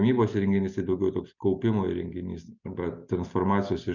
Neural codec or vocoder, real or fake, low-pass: none; real; 7.2 kHz